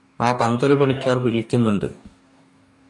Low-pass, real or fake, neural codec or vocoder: 10.8 kHz; fake; codec, 44.1 kHz, 2.6 kbps, DAC